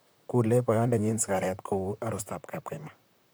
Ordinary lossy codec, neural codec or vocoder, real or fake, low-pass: none; vocoder, 44.1 kHz, 128 mel bands, Pupu-Vocoder; fake; none